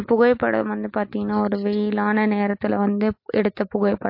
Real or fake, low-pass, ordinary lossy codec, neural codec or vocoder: real; 5.4 kHz; MP3, 32 kbps; none